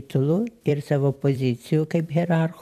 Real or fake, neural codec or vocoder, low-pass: fake; vocoder, 44.1 kHz, 128 mel bands every 256 samples, BigVGAN v2; 14.4 kHz